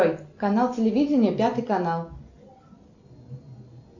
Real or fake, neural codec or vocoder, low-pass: real; none; 7.2 kHz